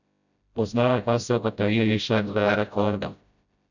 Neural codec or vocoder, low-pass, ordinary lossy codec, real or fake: codec, 16 kHz, 0.5 kbps, FreqCodec, smaller model; 7.2 kHz; none; fake